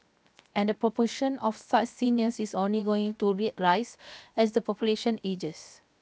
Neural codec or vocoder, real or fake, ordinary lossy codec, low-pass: codec, 16 kHz, 0.7 kbps, FocalCodec; fake; none; none